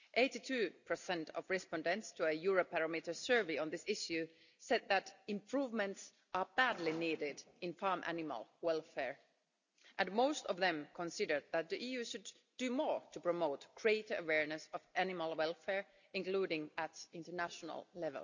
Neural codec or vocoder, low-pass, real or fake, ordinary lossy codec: none; 7.2 kHz; real; none